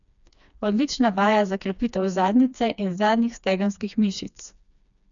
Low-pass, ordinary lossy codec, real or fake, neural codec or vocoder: 7.2 kHz; none; fake; codec, 16 kHz, 2 kbps, FreqCodec, smaller model